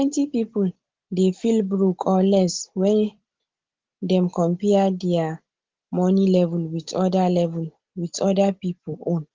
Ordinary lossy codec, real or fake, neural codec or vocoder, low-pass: Opus, 16 kbps; real; none; 7.2 kHz